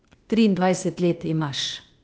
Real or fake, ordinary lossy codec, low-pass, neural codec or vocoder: fake; none; none; codec, 16 kHz, 0.8 kbps, ZipCodec